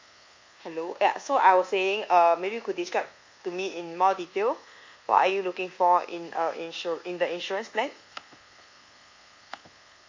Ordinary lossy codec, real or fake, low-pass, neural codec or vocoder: MP3, 48 kbps; fake; 7.2 kHz; codec, 24 kHz, 1.2 kbps, DualCodec